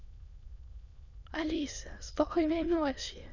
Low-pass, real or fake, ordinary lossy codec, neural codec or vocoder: 7.2 kHz; fake; none; autoencoder, 22.05 kHz, a latent of 192 numbers a frame, VITS, trained on many speakers